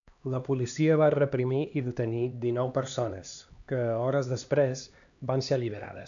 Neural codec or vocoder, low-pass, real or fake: codec, 16 kHz, 2 kbps, X-Codec, HuBERT features, trained on LibriSpeech; 7.2 kHz; fake